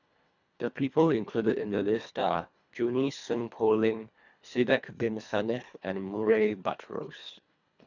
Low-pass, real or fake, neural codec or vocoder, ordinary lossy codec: 7.2 kHz; fake; codec, 24 kHz, 1.5 kbps, HILCodec; none